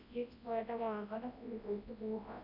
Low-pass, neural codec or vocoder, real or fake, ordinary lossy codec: 5.4 kHz; codec, 24 kHz, 0.9 kbps, WavTokenizer, large speech release; fake; none